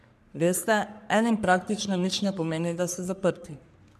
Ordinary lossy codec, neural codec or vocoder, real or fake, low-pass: none; codec, 44.1 kHz, 3.4 kbps, Pupu-Codec; fake; 14.4 kHz